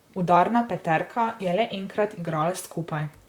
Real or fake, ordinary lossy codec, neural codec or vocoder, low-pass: fake; Opus, 64 kbps; vocoder, 44.1 kHz, 128 mel bands, Pupu-Vocoder; 19.8 kHz